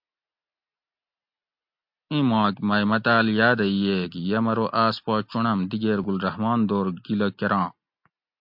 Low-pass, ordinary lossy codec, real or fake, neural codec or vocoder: 5.4 kHz; MP3, 48 kbps; real; none